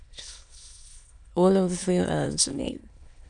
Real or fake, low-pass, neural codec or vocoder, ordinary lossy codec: fake; 9.9 kHz; autoencoder, 22.05 kHz, a latent of 192 numbers a frame, VITS, trained on many speakers; MP3, 96 kbps